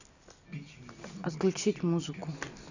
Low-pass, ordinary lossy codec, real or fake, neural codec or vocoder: 7.2 kHz; none; real; none